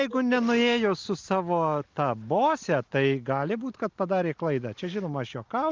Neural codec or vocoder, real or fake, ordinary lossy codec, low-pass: none; real; Opus, 32 kbps; 7.2 kHz